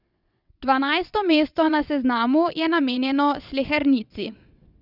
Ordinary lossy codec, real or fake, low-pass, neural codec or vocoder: none; fake; 5.4 kHz; vocoder, 22.05 kHz, 80 mel bands, WaveNeXt